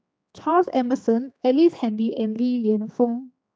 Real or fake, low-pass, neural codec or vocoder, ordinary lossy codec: fake; none; codec, 16 kHz, 2 kbps, X-Codec, HuBERT features, trained on general audio; none